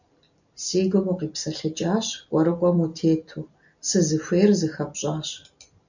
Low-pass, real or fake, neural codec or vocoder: 7.2 kHz; real; none